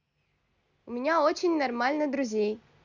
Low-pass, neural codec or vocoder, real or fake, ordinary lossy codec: 7.2 kHz; none; real; none